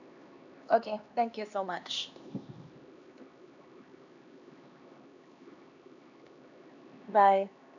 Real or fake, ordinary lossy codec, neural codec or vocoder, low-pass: fake; none; codec, 16 kHz, 2 kbps, X-Codec, HuBERT features, trained on LibriSpeech; 7.2 kHz